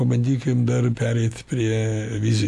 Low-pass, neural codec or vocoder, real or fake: 14.4 kHz; none; real